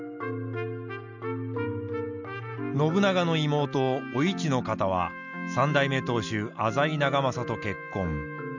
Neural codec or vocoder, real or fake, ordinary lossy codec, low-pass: none; real; none; 7.2 kHz